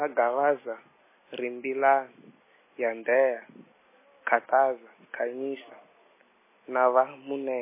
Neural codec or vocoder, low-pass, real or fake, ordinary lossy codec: none; 3.6 kHz; real; MP3, 16 kbps